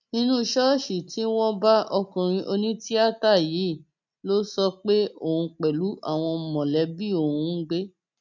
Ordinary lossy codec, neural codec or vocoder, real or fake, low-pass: none; none; real; 7.2 kHz